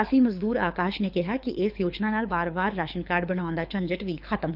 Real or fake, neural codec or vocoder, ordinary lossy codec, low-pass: fake; codec, 24 kHz, 6 kbps, HILCodec; none; 5.4 kHz